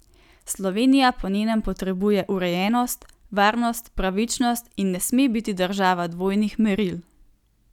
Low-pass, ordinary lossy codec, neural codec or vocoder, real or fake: 19.8 kHz; none; none; real